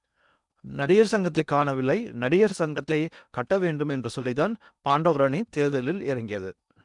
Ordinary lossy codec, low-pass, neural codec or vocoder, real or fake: none; 10.8 kHz; codec, 16 kHz in and 24 kHz out, 0.8 kbps, FocalCodec, streaming, 65536 codes; fake